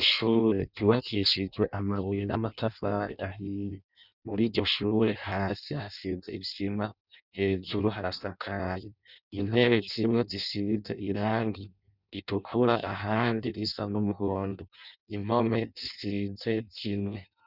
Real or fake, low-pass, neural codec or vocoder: fake; 5.4 kHz; codec, 16 kHz in and 24 kHz out, 0.6 kbps, FireRedTTS-2 codec